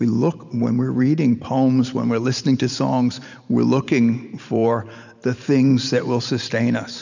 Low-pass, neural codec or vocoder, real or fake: 7.2 kHz; vocoder, 44.1 kHz, 128 mel bands every 512 samples, BigVGAN v2; fake